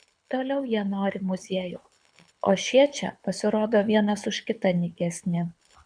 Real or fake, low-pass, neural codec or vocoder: fake; 9.9 kHz; codec, 24 kHz, 6 kbps, HILCodec